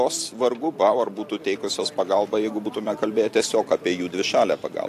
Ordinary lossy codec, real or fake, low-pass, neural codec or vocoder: AAC, 64 kbps; real; 14.4 kHz; none